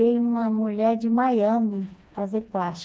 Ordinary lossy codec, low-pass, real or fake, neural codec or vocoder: none; none; fake; codec, 16 kHz, 2 kbps, FreqCodec, smaller model